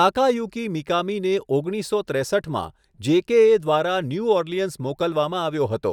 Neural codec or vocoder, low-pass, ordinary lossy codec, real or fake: none; 19.8 kHz; none; real